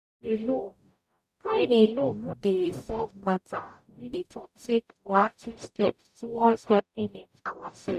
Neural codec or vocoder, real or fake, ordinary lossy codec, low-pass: codec, 44.1 kHz, 0.9 kbps, DAC; fake; none; 14.4 kHz